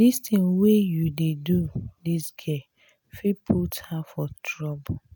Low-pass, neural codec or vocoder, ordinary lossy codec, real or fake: 19.8 kHz; none; none; real